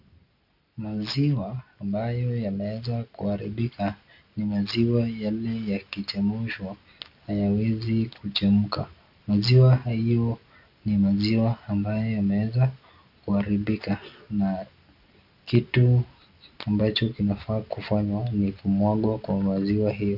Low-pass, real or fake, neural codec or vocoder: 5.4 kHz; real; none